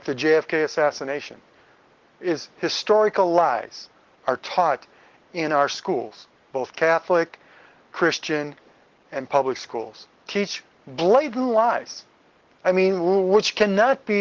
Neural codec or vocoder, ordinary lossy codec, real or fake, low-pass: none; Opus, 16 kbps; real; 7.2 kHz